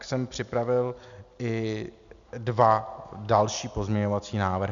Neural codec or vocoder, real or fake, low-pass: none; real; 7.2 kHz